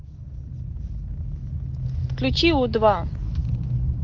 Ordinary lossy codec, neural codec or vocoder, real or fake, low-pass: Opus, 32 kbps; none; real; 7.2 kHz